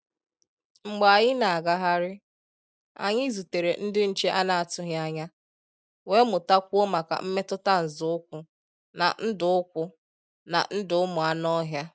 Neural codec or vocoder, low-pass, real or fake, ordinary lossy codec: none; none; real; none